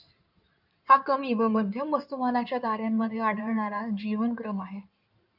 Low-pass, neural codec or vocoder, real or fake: 5.4 kHz; codec, 16 kHz in and 24 kHz out, 2.2 kbps, FireRedTTS-2 codec; fake